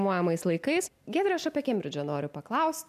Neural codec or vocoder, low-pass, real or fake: none; 14.4 kHz; real